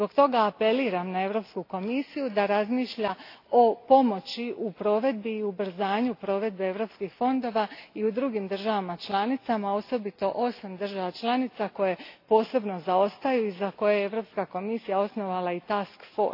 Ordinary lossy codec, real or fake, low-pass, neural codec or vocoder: AAC, 32 kbps; real; 5.4 kHz; none